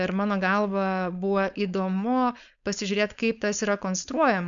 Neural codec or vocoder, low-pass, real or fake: codec, 16 kHz, 4.8 kbps, FACodec; 7.2 kHz; fake